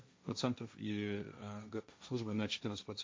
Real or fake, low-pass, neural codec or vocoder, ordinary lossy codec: fake; none; codec, 16 kHz, 1.1 kbps, Voila-Tokenizer; none